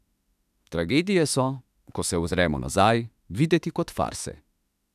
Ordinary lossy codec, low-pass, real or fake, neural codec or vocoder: none; 14.4 kHz; fake; autoencoder, 48 kHz, 32 numbers a frame, DAC-VAE, trained on Japanese speech